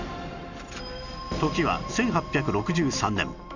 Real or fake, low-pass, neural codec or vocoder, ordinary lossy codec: real; 7.2 kHz; none; none